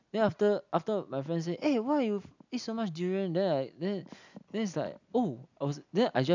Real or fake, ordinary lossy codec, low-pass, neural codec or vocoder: real; none; 7.2 kHz; none